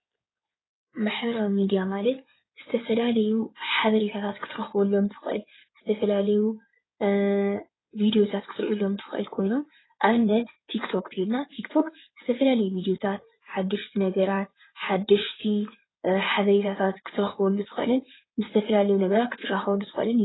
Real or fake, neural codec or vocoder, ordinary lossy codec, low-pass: fake; codec, 16 kHz in and 24 kHz out, 2.2 kbps, FireRedTTS-2 codec; AAC, 16 kbps; 7.2 kHz